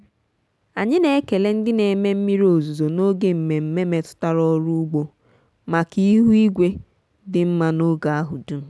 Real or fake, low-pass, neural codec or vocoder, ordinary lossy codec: real; none; none; none